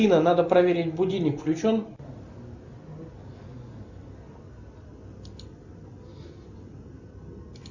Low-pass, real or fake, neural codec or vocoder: 7.2 kHz; real; none